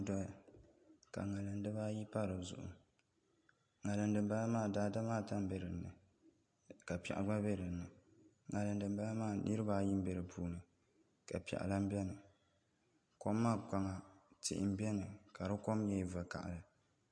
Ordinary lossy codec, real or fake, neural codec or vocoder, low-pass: MP3, 48 kbps; real; none; 9.9 kHz